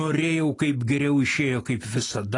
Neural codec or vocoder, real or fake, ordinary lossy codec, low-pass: none; real; AAC, 32 kbps; 10.8 kHz